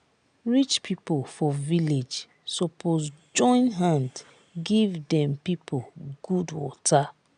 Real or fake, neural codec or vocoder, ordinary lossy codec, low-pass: real; none; none; 9.9 kHz